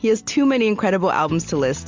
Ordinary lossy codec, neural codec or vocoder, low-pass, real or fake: MP3, 64 kbps; none; 7.2 kHz; real